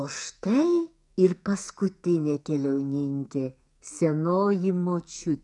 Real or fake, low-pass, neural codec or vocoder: fake; 10.8 kHz; codec, 44.1 kHz, 2.6 kbps, SNAC